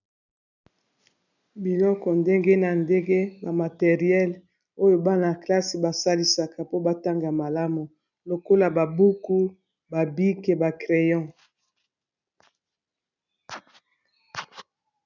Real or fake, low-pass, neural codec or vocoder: real; 7.2 kHz; none